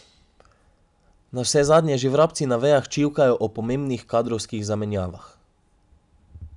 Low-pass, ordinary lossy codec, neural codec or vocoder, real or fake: 10.8 kHz; none; none; real